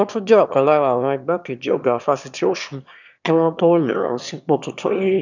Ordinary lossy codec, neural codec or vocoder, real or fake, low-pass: none; autoencoder, 22.05 kHz, a latent of 192 numbers a frame, VITS, trained on one speaker; fake; 7.2 kHz